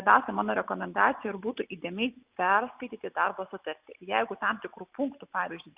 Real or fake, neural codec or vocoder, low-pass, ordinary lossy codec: real; none; 3.6 kHz; Opus, 64 kbps